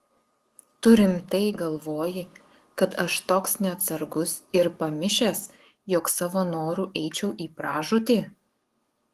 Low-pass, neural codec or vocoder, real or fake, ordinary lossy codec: 14.4 kHz; codec, 44.1 kHz, 7.8 kbps, DAC; fake; Opus, 24 kbps